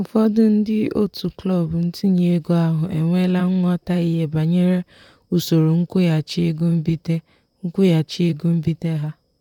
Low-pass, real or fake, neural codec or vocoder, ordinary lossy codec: 19.8 kHz; fake; vocoder, 44.1 kHz, 128 mel bands, Pupu-Vocoder; none